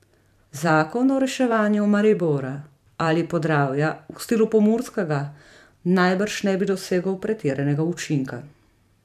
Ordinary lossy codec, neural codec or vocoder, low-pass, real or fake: none; vocoder, 44.1 kHz, 128 mel bands every 512 samples, BigVGAN v2; 14.4 kHz; fake